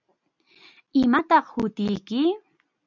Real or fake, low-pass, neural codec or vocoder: real; 7.2 kHz; none